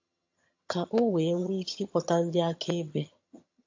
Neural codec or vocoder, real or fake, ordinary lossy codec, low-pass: vocoder, 22.05 kHz, 80 mel bands, HiFi-GAN; fake; AAC, 48 kbps; 7.2 kHz